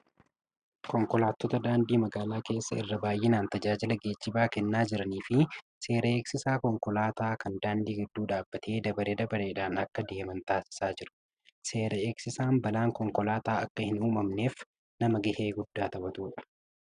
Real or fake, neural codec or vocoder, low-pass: real; none; 9.9 kHz